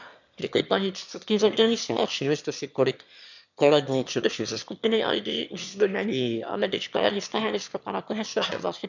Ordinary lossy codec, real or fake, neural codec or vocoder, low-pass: none; fake; autoencoder, 22.05 kHz, a latent of 192 numbers a frame, VITS, trained on one speaker; 7.2 kHz